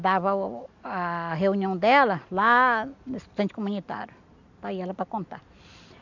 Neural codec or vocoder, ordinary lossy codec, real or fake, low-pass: none; none; real; 7.2 kHz